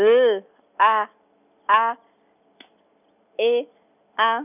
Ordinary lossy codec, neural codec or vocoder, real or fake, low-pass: none; none; real; 3.6 kHz